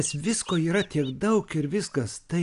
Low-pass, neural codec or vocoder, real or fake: 10.8 kHz; none; real